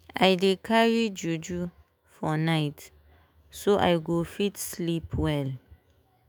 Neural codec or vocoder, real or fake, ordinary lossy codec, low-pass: autoencoder, 48 kHz, 128 numbers a frame, DAC-VAE, trained on Japanese speech; fake; none; none